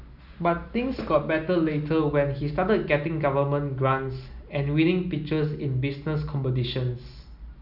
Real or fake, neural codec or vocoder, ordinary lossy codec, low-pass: real; none; none; 5.4 kHz